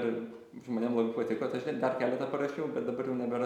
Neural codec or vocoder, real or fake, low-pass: none; real; 19.8 kHz